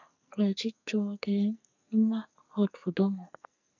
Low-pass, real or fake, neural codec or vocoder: 7.2 kHz; fake; codec, 44.1 kHz, 2.6 kbps, SNAC